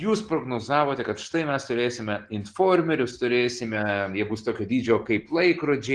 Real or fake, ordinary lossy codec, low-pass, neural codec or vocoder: real; Opus, 16 kbps; 9.9 kHz; none